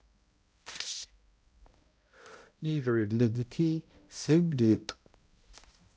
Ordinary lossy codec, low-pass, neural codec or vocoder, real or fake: none; none; codec, 16 kHz, 0.5 kbps, X-Codec, HuBERT features, trained on balanced general audio; fake